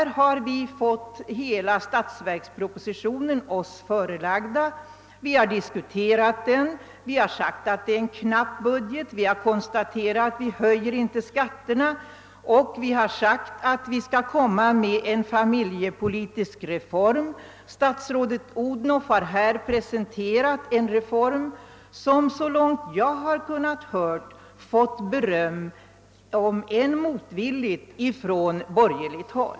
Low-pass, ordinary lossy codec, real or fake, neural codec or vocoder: none; none; real; none